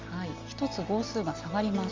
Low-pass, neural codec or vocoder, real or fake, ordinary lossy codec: 7.2 kHz; none; real; Opus, 32 kbps